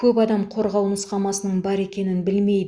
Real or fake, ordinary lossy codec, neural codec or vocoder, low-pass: real; Opus, 64 kbps; none; 9.9 kHz